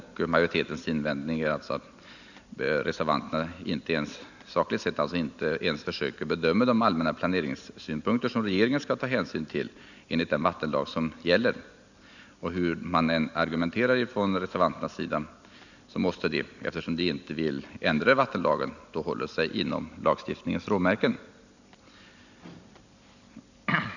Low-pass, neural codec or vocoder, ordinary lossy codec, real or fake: 7.2 kHz; none; none; real